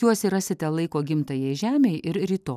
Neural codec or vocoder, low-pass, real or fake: none; 14.4 kHz; real